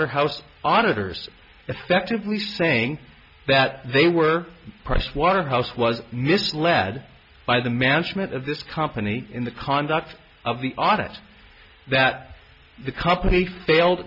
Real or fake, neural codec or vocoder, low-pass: real; none; 5.4 kHz